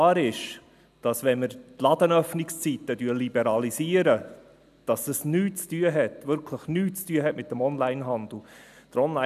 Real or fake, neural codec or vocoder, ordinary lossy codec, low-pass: real; none; none; 14.4 kHz